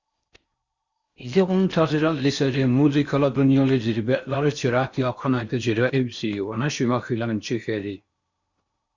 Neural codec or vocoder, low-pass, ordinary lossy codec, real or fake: codec, 16 kHz in and 24 kHz out, 0.6 kbps, FocalCodec, streaming, 4096 codes; 7.2 kHz; Opus, 64 kbps; fake